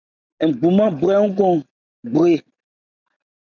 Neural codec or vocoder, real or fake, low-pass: none; real; 7.2 kHz